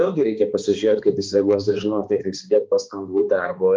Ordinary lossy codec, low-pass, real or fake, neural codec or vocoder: Opus, 24 kbps; 7.2 kHz; fake; codec, 16 kHz, 2 kbps, X-Codec, HuBERT features, trained on balanced general audio